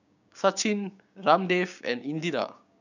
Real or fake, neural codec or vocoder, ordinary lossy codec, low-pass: fake; codec, 16 kHz, 6 kbps, DAC; none; 7.2 kHz